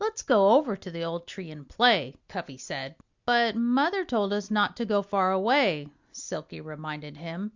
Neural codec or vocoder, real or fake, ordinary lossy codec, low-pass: none; real; Opus, 64 kbps; 7.2 kHz